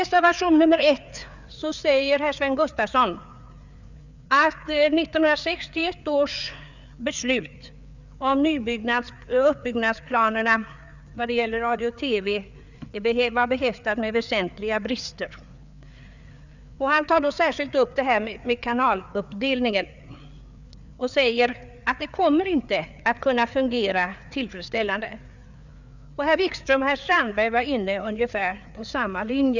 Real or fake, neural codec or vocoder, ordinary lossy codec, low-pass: fake; codec, 16 kHz, 4 kbps, FreqCodec, larger model; none; 7.2 kHz